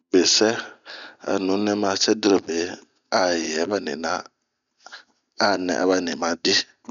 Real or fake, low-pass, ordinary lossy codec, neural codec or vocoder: real; 7.2 kHz; none; none